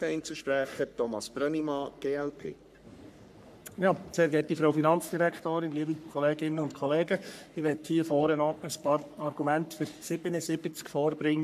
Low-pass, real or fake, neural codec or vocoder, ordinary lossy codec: 14.4 kHz; fake; codec, 44.1 kHz, 3.4 kbps, Pupu-Codec; MP3, 96 kbps